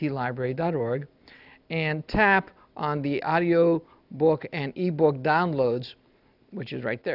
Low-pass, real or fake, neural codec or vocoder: 5.4 kHz; real; none